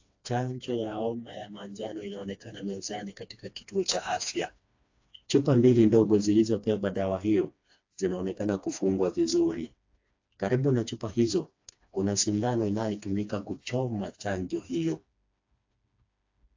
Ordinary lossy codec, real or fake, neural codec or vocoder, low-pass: AAC, 48 kbps; fake; codec, 16 kHz, 2 kbps, FreqCodec, smaller model; 7.2 kHz